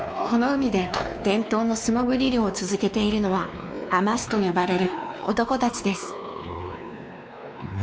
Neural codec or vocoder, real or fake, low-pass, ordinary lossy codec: codec, 16 kHz, 2 kbps, X-Codec, WavLM features, trained on Multilingual LibriSpeech; fake; none; none